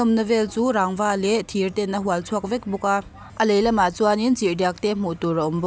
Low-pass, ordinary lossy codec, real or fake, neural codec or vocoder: none; none; real; none